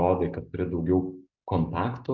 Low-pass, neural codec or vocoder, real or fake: 7.2 kHz; none; real